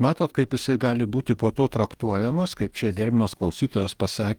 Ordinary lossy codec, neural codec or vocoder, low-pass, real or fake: Opus, 32 kbps; codec, 44.1 kHz, 2.6 kbps, DAC; 19.8 kHz; fake